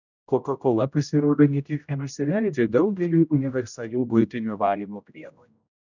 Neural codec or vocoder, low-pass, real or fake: codec, 16 kHz, 0.5 kbps, X-Codec, HuBERT features, trained on general audio; 7.2 kHz; fake